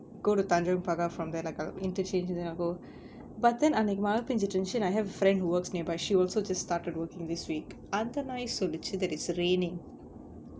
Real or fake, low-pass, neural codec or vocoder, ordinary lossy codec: real; none; none; none